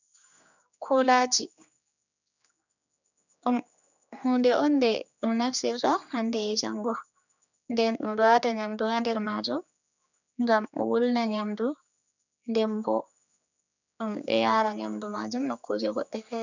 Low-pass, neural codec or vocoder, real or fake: 7.2 kHz; codec, 16 kHz, 2 kbps, X-Codec, HuBERT features, trained on general audio; fake